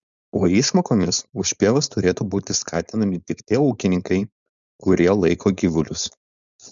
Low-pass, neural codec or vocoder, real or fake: 7.2 kHz; codec, 16 kHz, 4.8 kbps, FACodec; fake